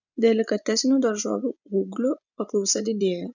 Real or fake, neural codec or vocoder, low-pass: fake; vocoder, 24 kHz, 100 mel bands, Vocos; 7.2 kHz